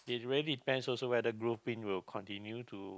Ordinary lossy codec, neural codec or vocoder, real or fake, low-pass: none; none; real; none